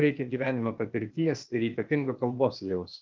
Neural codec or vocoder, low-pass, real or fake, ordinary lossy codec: codec, 16 kHz, 0.8 kbps, ZipCodec; 7.2 kHz; fake; Opus, 24 kbps